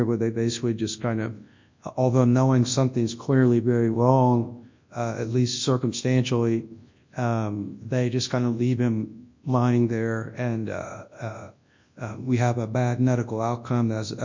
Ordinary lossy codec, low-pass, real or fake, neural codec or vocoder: MP3, 64 kbps; 7.2 kHz; fake; codec, 24 kHz, 0.9 kbps, WavTokenizer, large speech release